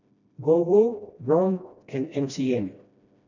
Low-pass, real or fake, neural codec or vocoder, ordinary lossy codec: 7.2 kHz; fake; codec, 16 kHz, 1 kbps, FreqCodec, smaller model; none